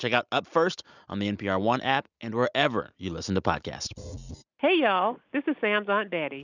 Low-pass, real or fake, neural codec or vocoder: 7.2 kHz; real; none